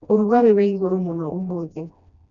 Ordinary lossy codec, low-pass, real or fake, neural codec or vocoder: none; 7.2 kHz; fake; codec, 16 kHz, 1 kbps, FreqCodec, smaller model